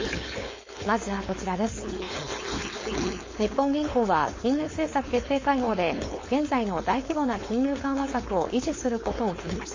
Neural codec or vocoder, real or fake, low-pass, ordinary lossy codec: codec, 16 kHz, 4.8 kbps, FACodec; fake; 7.2 kHz; MP3, 32 kbps